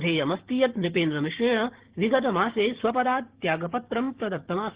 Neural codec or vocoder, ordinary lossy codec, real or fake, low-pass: codec, 16 kHz, 6 kbps, DAC; Opus, 16 kbps; fake; 3.6 kHz